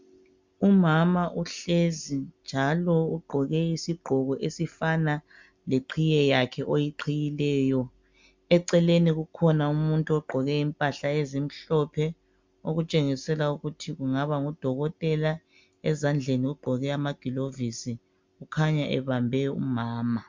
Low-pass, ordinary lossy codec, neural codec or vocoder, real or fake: 7.2 kHz; MP3, 64 kbps; none; real